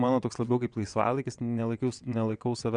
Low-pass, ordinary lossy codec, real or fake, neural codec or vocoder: 9.9 kHz; Opus, 64 kbps; fake; vocoder, 22.05 kHz, 80 mel bands, WaveNeXt